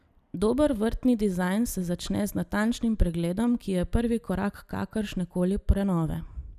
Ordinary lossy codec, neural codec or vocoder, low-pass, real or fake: none; none; 14.4 kHz; real